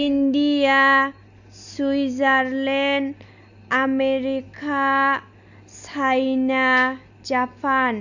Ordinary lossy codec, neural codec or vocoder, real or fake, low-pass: none; none; real; 7.2 kHz